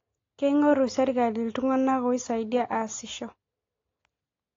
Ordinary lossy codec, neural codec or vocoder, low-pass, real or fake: AAC, 32 kbps; none; 7.2 kHz; real